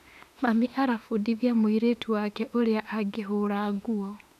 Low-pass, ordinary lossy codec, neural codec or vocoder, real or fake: 14.4 kHz; none; autoencoder, 48 kHz, 32 numbers a frame, DAC-VAE, trained on Japanese speech; fake